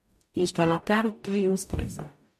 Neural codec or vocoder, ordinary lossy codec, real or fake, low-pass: codec, 44.1 kHz, 0.9 kbps, DAC; MP3, 64 kbps; fake; 14.4 kHz